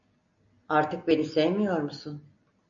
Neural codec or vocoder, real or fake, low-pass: none; real; 7.2 kHz